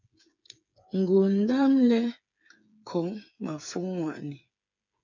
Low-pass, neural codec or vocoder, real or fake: 7.2 kHz; codec, 16 kHz, 8 kbps, FreqCodec, smaller model; fake